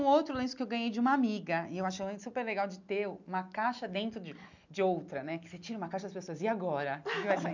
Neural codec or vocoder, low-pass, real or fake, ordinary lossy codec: none; 7.2 kHz; real; none